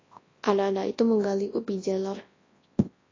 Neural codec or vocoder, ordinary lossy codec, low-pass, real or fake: codec, 24 kHz, 0.9 kbps, WavTokenizer, large speech release; AAC, 32 kbps; 7.2 kHz; fake